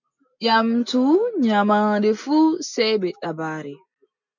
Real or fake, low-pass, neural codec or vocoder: real; 7.2 kHz; none